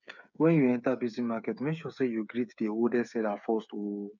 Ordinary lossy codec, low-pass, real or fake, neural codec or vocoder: none; 7.2 kHz; fake; codec, 16 kHz, 8 kbps, FreqCodec, smaller model